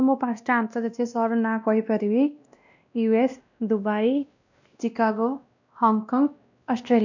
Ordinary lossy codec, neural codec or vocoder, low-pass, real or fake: none; codec, 16 kHz, 1 kbps, X-Codec, WavLM features, trained on Multilingual LibriSpeech; 7.2 kHz; fake